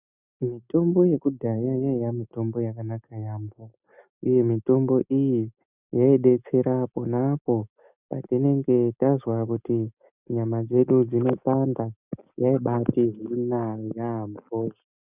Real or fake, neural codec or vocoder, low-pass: real; none; 3.6 kHz